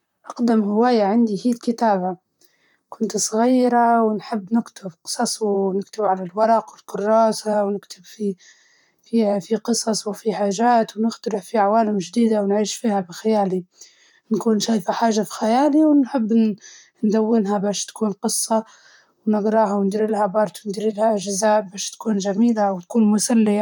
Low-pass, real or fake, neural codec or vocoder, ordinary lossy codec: 19.8 kHz; fake; vocoder, 44.1 kHz, 128 mel bands, Pupu-Vocoder; none